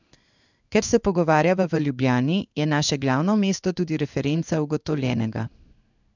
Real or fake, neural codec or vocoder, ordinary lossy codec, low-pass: fake; codec, 16 kHz in and 24 kHz out, 1 kbps, XY-Tokenizer; none; 7.2 kHz